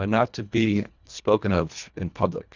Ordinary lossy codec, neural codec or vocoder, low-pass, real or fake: Opus, 64 kbps; codec, 24 kHz, 1.5 kbps, HILCodec; 7.2 kHz; fake